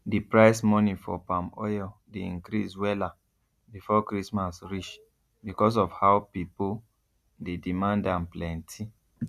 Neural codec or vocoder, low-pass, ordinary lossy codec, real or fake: none; 14.4 kHz; none; real